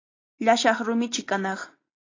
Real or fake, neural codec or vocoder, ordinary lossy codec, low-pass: real; none; AAC, 32 kbps; 7.2 kHz